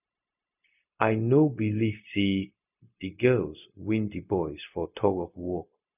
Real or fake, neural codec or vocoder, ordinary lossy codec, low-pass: fake; codec, 16 kHz, 0.4 kbps, LongCat-Audio-Codec; none; 3.6 kHz